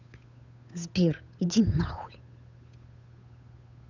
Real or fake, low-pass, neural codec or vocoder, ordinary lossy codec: fake; 7.2 kHz; codec, 16 kHz, 8 kbps, FunCodec, trained on Chinese and English, 25 frames a second; none